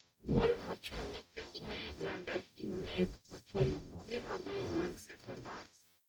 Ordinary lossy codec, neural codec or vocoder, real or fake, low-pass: none; codec, 44.1 kHz, 0.9 kbps, DAC; fake; 19.8 kHz